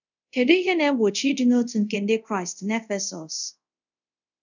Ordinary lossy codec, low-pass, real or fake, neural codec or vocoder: none; 7.2 kHz; fake; codec, 24 kHz, 0.5 kbps, DualCodec